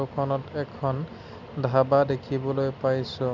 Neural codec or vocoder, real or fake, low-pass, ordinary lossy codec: none; real; 7.2 kHz; none